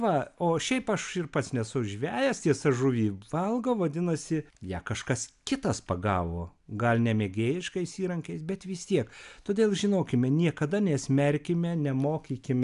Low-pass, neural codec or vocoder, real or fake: 10.8 kHz; none; real